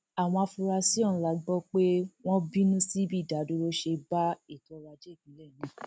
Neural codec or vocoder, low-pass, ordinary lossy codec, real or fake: none; none; none; real